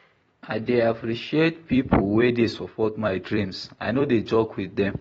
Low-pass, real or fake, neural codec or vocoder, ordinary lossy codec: 19.8 kHz; fake; vocoder, 44.1 kHz, 128 mel bands every 256 samples, BigVGAN v2; AAC, 24 kbps